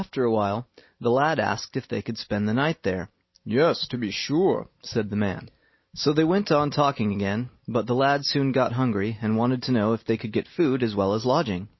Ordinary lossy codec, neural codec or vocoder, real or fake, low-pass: MP3, 24 kbps; none; real; 7.2 kHz